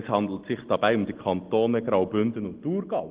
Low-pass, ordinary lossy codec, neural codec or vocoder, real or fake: 3.6 kHz; Opus, 16 kbps; none; real